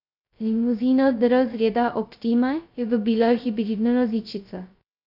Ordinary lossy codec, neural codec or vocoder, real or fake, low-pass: none; codec, 16 kHz, 0.2 kbps, FocalCodec; fake; 5.4 kHz